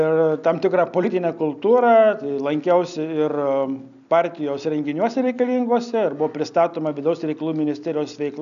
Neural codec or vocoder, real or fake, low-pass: none; real; 7.2 kHz